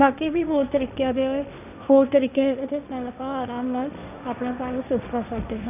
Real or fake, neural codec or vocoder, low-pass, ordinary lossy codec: fake; codec, 16 kHz, 1.1 kbps, Voila-Tokenizer; 3.6 kHz; none